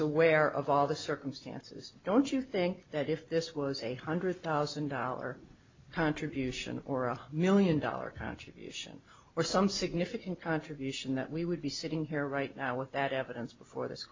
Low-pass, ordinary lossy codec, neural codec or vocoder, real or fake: 7.2 kHz; MP3, 48 kbps; none; real